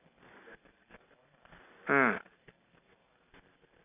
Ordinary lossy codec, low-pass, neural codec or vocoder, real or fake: none; 3.6 kHz; none; real